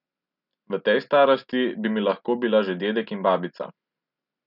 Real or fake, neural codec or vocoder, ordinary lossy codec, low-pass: real; none; none; 5.4 kHz